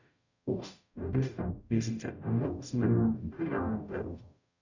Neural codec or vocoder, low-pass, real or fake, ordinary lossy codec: codec, 44.1 kHz, 0.9 kbps, DAC; 7.2 kHz; fake; none